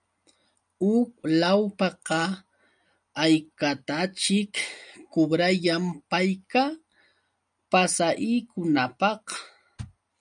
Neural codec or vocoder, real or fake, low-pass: none; real; 9.9 kHz